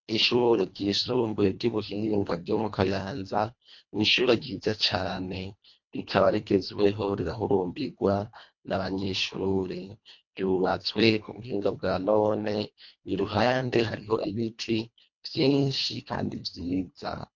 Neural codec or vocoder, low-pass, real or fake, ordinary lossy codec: codec, 24 kHz, 1.5 kbps, HILCodec; 7.2 kHz; fake; MP3, 48 kbps